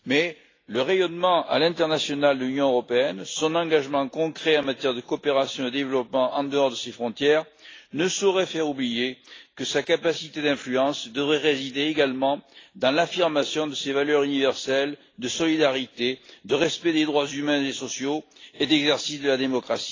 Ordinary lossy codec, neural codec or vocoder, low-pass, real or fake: AAC, 32 kbps; none; 7.2 kHz; real